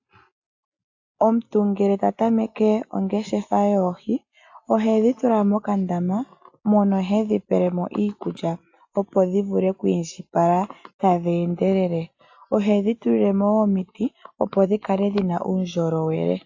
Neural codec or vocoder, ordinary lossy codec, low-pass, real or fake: none; AAC, 48 kbps; 7.2 kHz; real